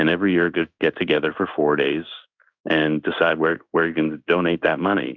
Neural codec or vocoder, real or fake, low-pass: codec, 16 kHz in and 24 kHz out, 1 kbps, XY-Tokenizer; fake; 7.2 kHz